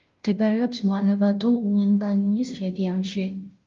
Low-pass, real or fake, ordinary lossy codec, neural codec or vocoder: 7.2 kHz; fake; Opus, 32 kbps; codec, 16 kHz, 0.5 kbps, FunCodec, trained on Chinese and English, 25 frames a second